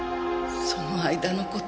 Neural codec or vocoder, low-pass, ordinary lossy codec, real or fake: none; none; none; real